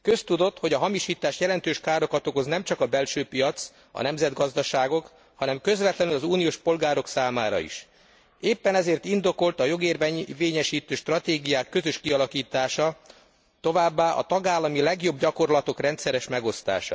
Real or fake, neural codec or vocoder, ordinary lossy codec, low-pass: real; none; none; none